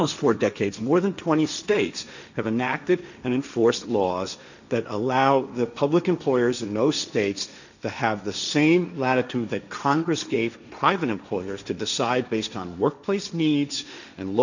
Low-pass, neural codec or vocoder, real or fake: 7.2 kHz; codec, 16 kHz, 1.1 kbps, Voila-Tokenizer; fake